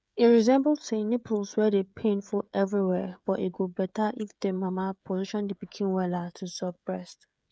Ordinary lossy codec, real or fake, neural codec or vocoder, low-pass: none; fake; codec, 16 kHz, 16 kbps, FreqCodec, smaller model; none